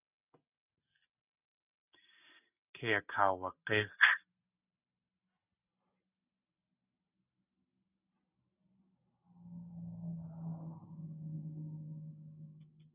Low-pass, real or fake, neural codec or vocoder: 3.6 kHz; real; none